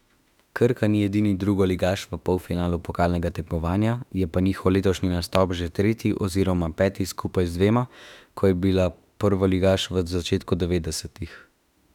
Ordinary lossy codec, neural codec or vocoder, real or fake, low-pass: none; autoencoder, 48 kHz, 32 numbers a frame, DAC-VAE, trained on Japanese speech; fake; 19.8 kHz